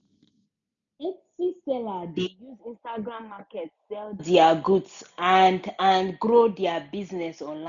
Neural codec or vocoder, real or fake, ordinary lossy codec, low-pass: none; real; none; 7.2 kHz